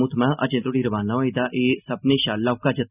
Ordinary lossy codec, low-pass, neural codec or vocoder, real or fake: none; 3.6 kHz; none; real